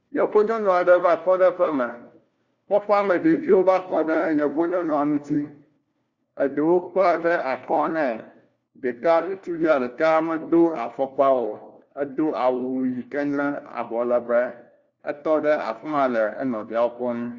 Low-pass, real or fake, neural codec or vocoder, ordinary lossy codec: 7.2 kHz; fake; codec, 16 kHz, 1 kbps, FunCodec, trained on LibriTTS, 50 frames a second; Opus, 64 kbps